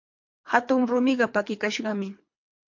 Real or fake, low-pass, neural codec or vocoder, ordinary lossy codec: fake; 7.2 kHz; codec, 24 kHz, 3 kbps, HILCodec; MP3, 48 kbps